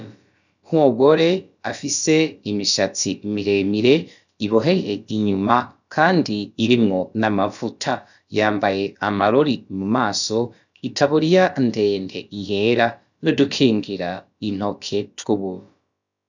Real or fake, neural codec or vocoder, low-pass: fake; codec, 16 kHz, about 1 kbps, DyCAST, with the encoder's durations; 7.2 kHz